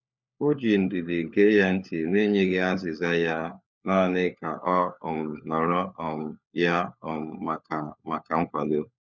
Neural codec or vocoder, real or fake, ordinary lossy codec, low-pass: codec, 16 kHz, 4 kbps, FunCodec, trained on LibriTTS, 50 frames a second; fake; none; 7.2 kHz